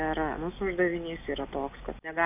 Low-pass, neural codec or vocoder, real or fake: 3.6 kHz; none; real